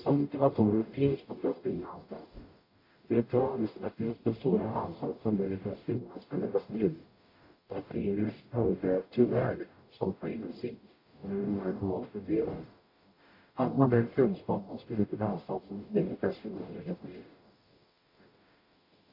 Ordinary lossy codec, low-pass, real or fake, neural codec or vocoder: AAC, 32 kbps; 5.4 kHz; fake; codec, 44.1 kHz, 0.9 kbps, DAC